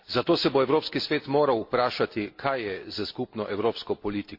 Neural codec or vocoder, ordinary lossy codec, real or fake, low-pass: none; MP3, 32 kbps; real; 5.4 kHz